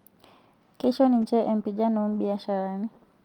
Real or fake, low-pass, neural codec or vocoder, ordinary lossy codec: real; 19.8 kHz; none; Opus, 32 kbps